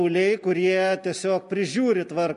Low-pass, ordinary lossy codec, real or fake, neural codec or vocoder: 14.4 kHz; MP3, 48 kbps; real; none